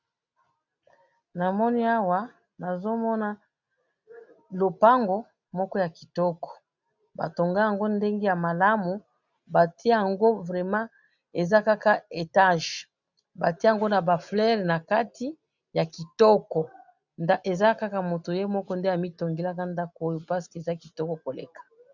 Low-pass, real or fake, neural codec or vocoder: 7.2 kHz; real; none